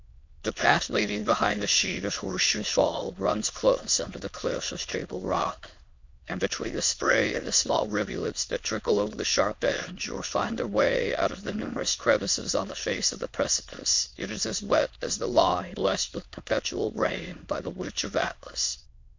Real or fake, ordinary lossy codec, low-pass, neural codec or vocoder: fake; MP3, 48 kbps; 7.2 kHz; autoencoder, 22.05 kHz, a latent of 192 numbers a frame, VITS, trained on many speakers